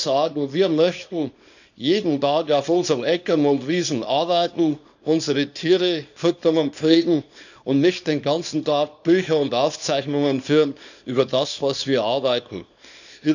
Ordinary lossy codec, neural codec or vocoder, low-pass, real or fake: none; codec, 24 kHz, 0.9 kbps, WavTokenizer, small release; 7.2 kHz; fake